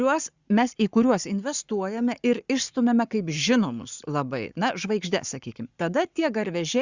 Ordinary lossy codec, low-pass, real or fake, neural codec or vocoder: Opus, 64 kbps; 7.2 kHz; fake; codec, 44.1 kHz, 7.8 kbps, Pupu-Codec